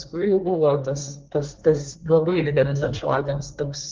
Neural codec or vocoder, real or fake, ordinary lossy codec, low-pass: codec, 24 kHz, 1 kbps, SNAC; fake; Opus, 16 kbps; 7.2 kHz